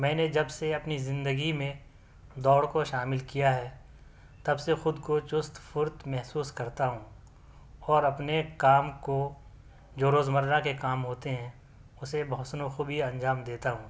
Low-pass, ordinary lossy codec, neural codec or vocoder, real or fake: none; none; none; real